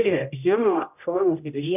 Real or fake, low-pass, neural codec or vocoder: fake; 3.6 kHz; codec, 16 kHz, 0.5 kbps, X-Codec, HuBERT features, trained on general audio